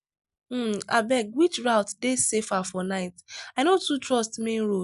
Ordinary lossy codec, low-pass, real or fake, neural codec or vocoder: none; 10.8 kHz; real; none